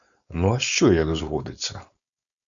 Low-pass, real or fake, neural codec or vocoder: 7.2 kHz; fake; codec, 16 kHz, 4.8 kbps, FACodec